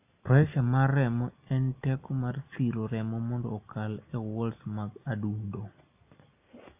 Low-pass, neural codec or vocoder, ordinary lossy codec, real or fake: 3.6 kHz; none; AAC, 32 kbps; real